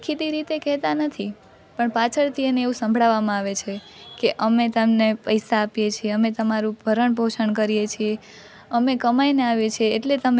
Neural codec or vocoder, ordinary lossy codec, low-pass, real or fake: none; none; none; real